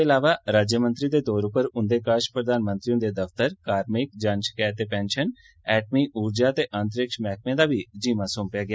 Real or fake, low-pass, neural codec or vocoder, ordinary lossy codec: real; none; none; none